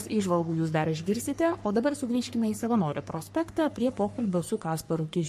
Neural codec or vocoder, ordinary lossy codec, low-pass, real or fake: codec, 44.1 kHz, 3.4 kbps, Pupu-Codec; MP3, 64 kbps; 14.4 kHz; fake